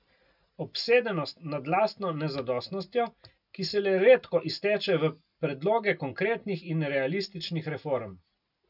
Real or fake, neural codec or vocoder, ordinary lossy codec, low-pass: real; none; none; 5.4 kHz